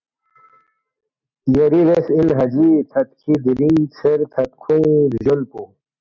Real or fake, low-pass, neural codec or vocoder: real; 7.2 kHz; none